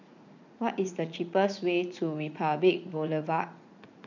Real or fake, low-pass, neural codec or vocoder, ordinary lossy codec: fake; 7.2 kHz; vocoder, 44.1 kHz, 80 mel bands, Vocos; none